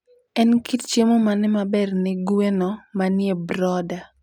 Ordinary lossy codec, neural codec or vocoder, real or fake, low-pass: none; none; real; 19.8 kHz